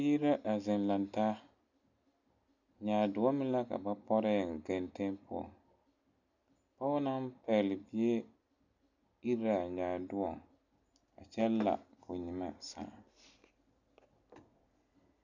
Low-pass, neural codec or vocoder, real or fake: 7.2 kHz; none; real